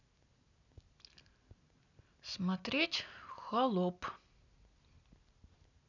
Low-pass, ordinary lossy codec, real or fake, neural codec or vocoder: 7.2 kHz; none; real; none